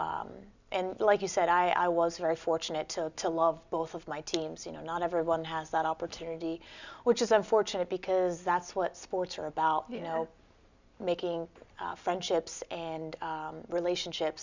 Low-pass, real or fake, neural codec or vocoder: 7.2 kHz; real; none